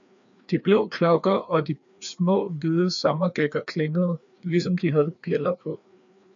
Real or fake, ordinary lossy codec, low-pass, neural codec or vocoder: fake; MP3, 64 kbps; 7.2 kHz; codec, 16 kHz, 2 kbps, FreqCodec, larger model